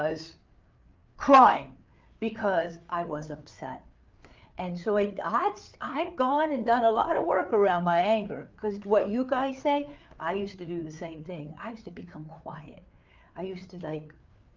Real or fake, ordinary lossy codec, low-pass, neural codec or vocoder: fake; Opus, 24 kbps; 7.2 kHz; codec, 16 kHz, 4 kbps, FreqCodec, larger model